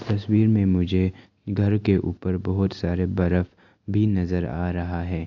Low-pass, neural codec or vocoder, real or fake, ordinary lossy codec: 7.2 kHz; none; real; AAC, 48 kbps